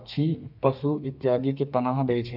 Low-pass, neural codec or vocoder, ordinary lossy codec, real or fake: 5.4 kHz; codec, 32 kHz, 1.9 kbps, SNAC; none; fake